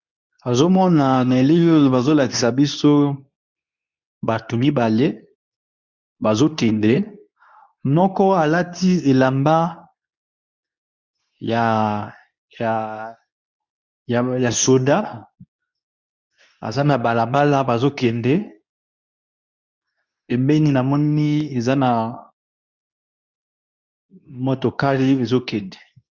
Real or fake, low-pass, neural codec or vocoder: fake; 7.2 kHz; codec, 24 kHz, 0.9 kbps, WavTokenizer, medium speech release version 2